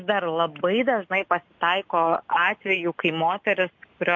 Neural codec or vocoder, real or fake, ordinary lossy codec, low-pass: none; real; MP3, 48 kbps; 7.2 kHz